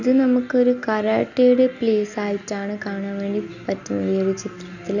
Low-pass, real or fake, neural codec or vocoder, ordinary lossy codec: 7.2 kHz; real; none; none